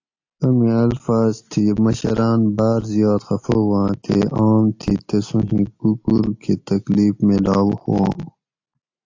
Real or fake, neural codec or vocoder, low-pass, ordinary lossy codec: real; none; 7.2 kHz; AAC, 48 kbps